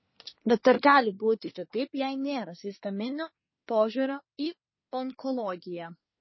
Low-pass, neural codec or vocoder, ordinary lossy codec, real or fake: 7.2 kHz; codec, 24 kHz, 1 kbps, SNAC; MP3, 24 kbps; fake